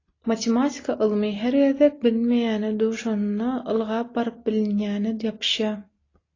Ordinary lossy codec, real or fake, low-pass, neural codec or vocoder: AAC, 32 kbps; real; 7.2 kHz; none